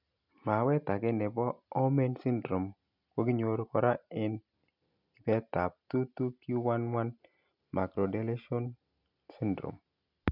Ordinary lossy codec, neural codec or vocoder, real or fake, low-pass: none; none; real; 5.4 kHz